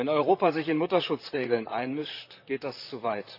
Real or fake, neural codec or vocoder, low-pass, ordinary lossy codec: fake; vocoder, 44.1 kHz, 128 mel bands, Pupu-Vocoder; 5.4 kHz; none